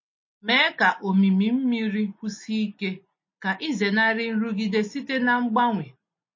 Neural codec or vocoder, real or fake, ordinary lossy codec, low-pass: none; real; MP3, 32 kbps; 7.2 kHz